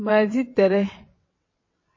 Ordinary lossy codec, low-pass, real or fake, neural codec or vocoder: MP3, 32 kbps; 7.2 kHz; fake; vocoder, 22.05 kHz, 80 mel bands, WaveNeXt